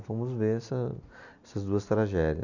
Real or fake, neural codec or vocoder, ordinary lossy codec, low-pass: real; none; none; 7.2 kHz